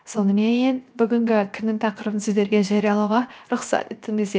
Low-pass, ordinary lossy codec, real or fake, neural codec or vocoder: none; none; fake; codec, 16 kHz, 0.7 kbps, FocalCodec